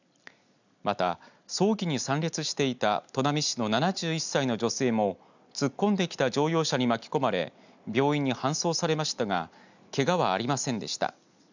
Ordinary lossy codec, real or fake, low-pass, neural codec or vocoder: none; real; 7.2 kHz; none